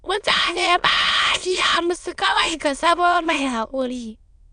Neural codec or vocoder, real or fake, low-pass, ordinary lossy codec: autoencoder, 22.05 kHz, a latent of 192 numbers a frame, VITS, trained on many speakers; fake; 9.9 kHz; none